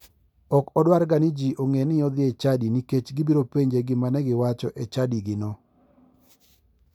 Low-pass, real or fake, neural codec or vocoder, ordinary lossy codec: 19.8 kHz; real; none; none